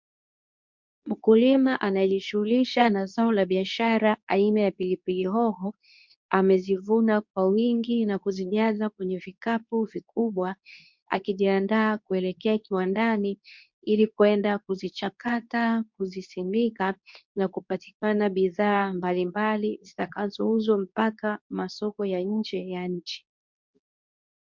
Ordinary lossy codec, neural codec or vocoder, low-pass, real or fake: MP3, 64 kbps; codec, 24 kHz, 0.9 kbps, WavTokenizer, medium speech release version 2; 7.2 kHz; fake